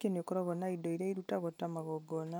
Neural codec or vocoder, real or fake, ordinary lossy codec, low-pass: none; real; none; none